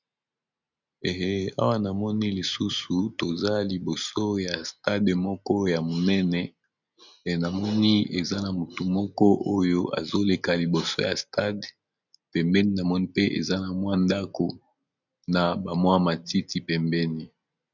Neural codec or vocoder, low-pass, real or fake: none; 7.2 kHz; real